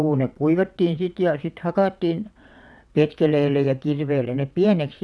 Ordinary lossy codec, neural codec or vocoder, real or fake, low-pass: none; vocoder, 22.05 kHz, 80 mel bands, WaveNeXt; fake; none